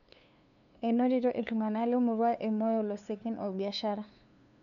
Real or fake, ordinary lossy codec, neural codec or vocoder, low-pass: fake; none; codec, 16 kHz, 2 kbps, FunCodec, trained on LibriTTS, 25 frames a second; 7.2 kHz